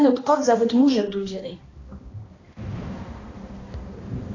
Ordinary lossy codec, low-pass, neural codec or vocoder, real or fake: AAC, 32 kbps; 7.2 kHz; codec, 16 kHz, 1 kbps, X-Codec, HuBERT features, trained on balanced general audio; fake